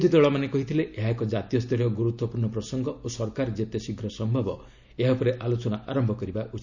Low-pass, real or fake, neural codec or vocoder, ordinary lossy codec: 7.2 kHz; real; none; none